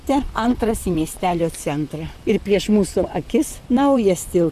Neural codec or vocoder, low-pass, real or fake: vocoder, 44.1 kHz, 128 mel bands, Pupu-Vocoder; 14.4 kHz; fake